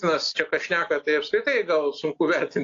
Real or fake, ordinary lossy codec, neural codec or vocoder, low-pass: real; AAC, 48 kbps; none; 7.2 kHz